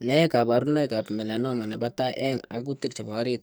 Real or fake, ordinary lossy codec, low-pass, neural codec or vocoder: fake; none; none; codec, 44.1 kHz, 2.6 kbps, SNAC